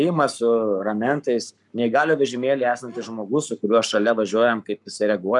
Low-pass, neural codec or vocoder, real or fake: 10.8 kHz; codec, 44.1 kHz, 7.8 kbps, Pupu-Codec; fake